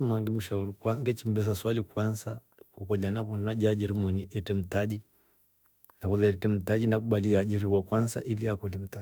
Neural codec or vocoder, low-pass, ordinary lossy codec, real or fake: autoencoder, 48 kHz, 32 numbers a frame, DAC-VAE, trained on Japanese speech; none; none; fake